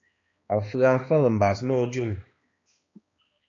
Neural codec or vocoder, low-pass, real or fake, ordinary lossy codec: codec, 16 kHz, 2 kbps, X-Codec, HuBERT features, trained on balanced general audio; 7.2 kHz; fake; AAC, 32 kbps